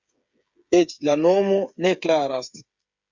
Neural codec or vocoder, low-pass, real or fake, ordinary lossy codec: codec, 16 kHz, 8 kbps, FreqCodec, smaller model; 7.2 kHz; fake; Opus, 64 kbps